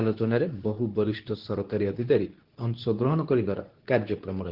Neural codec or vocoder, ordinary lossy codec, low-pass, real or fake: codec, 24 kHz, 0.9 kbps, WavTokenizer, medium speech release version 2; Opus, 16 kbps; 5.4 kHz; fake